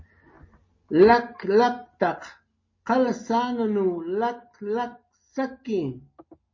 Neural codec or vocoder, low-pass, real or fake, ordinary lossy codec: none; 7.2 kHz; real; MP3, 32 kbps